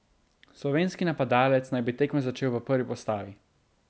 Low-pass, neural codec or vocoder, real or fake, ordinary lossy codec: none; none; real; none